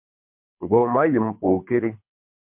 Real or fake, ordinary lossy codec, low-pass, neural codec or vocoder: fake; MP3, 32 kbps; 3.6 kHz; codec, 24 kHz, 3 kbps, HILCodec